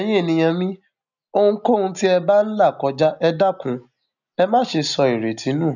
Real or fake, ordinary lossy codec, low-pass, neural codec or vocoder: real; none; 7.2 kHz; none